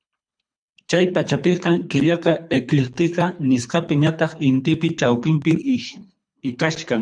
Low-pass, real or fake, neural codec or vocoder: 9.9 kHz; fake; codec, 24 kHz, 3 kbps, HILCodec